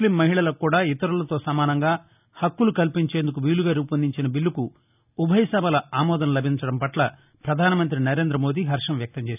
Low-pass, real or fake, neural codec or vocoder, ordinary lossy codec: 3.6 kHz; real; none; none